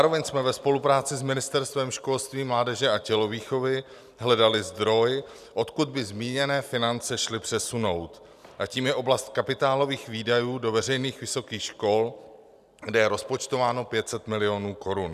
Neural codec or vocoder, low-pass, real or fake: none; 14.4 kHz; real